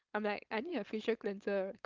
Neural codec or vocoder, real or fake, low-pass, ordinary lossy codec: codec, 16 kHz, 4.8 kbps, FACodec; fake; 7.2 kHz; Opus, 32 kbps